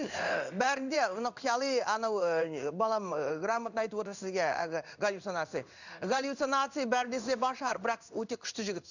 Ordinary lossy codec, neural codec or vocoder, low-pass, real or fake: none; codec, 16 kHz in and 24 kHz out, 1 kbps, XY-Tokenizer; 7.2 kHz; fake